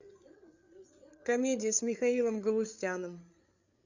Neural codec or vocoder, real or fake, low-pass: codec, 16 kHz, 8 kbps, FreqCodec, larger model; fake; 7.2 kHz